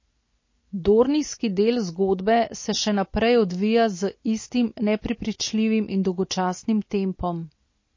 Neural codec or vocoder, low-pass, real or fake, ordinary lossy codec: none; 7.2 kHz; real; MP3, 32 kbps